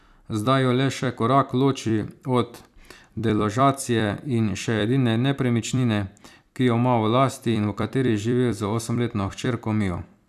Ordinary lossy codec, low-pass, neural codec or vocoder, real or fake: none; 14.4 kHz; vocoder, 44.1 kHz, 128 mel bands every 256 samples, BigVGAN v2; fake